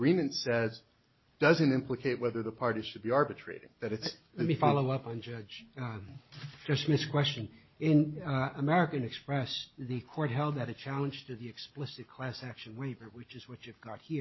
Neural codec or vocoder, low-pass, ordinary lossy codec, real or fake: none; 7.2 kHz; MP3, 24 kbps; real